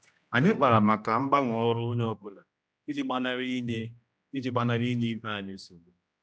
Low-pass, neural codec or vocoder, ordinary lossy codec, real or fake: none; codec, 16 kHz, 1 kbps, X-Codec, HuBERT features, trained on general audio; none; fake